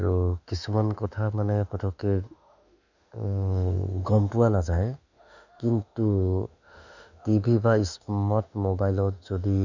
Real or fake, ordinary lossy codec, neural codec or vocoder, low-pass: fake; none; autoencoder, 48 kHz, 32 numbers a frame, DAC-VAE, trained on Japanese speech; 7.2 kHz